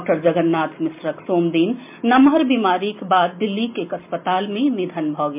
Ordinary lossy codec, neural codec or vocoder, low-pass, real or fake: MP3, 32 kbps; none; 3.6 kHz; real